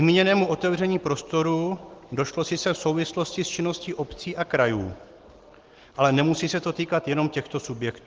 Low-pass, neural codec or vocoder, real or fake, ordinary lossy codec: 7.2 kHz; none; real; Opus, 16 kbps